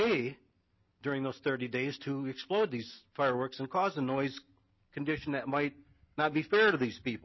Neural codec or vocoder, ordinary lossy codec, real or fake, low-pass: none; MP3, 24 kbps; real; 7.2 kHz